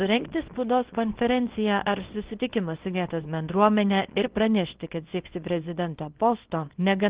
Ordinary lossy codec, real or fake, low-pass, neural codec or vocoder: Opus, 16 kbps; fake; 3.6 kHz; codec, 24 kHz, 0.9 kbps, WavTokenizer, small release